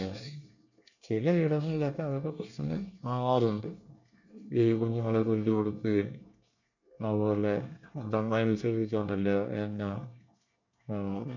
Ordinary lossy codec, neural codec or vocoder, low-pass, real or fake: none; codec, 24 kHz, 1 kbps, SNAC; 7.2 kHz; fake